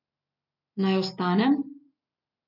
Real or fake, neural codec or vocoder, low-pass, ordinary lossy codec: real; none; 5.4 kHz; none